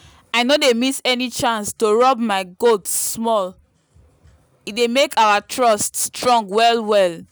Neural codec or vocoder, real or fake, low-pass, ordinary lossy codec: none; real; none; none